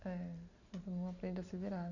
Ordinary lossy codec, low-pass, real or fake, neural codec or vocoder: none; 7.2 kHz; real; none